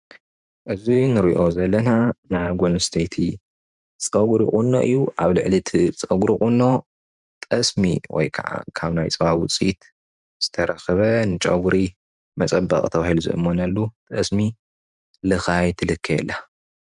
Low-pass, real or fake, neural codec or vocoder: 10.8 kHz; fake; vocoder, 44.1 kHz, 128 mel bands every 512 samples, BigVGAN v2